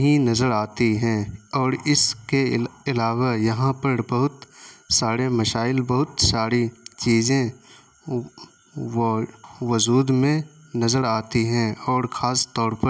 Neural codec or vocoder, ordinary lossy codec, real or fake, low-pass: none; none; real; none